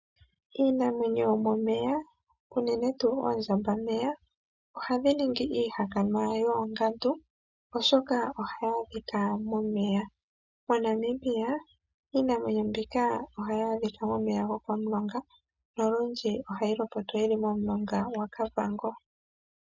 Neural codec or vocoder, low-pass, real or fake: none; 7.2 kHz; real